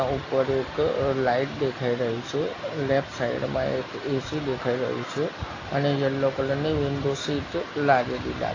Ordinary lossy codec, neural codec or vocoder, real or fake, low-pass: AAC, 32 kbps; none; real; 7.2 kHz